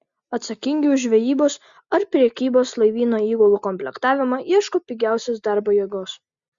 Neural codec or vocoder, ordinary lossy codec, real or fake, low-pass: none; Opus, 64 kbps; real; 7.2 kHz